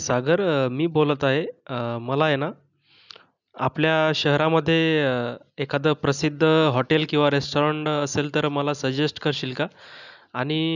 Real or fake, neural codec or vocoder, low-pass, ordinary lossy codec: real; none; 7.2 kHz; none